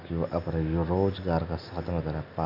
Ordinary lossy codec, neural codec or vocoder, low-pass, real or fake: none; none; 5.4 kHz; real